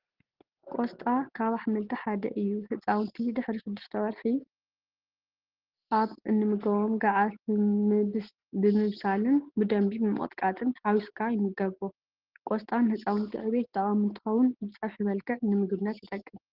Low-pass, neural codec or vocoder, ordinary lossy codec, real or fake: 5.4 kHz; none; Opus, 16 kbps; real